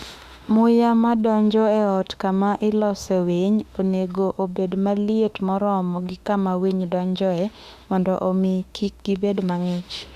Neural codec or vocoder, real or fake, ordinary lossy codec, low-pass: autoencoder, 48 kHz, 32 numbers a frame, DAC-VAE, trained on Japanese speech; fake; Opus, 64 kbps; 14.4 kHz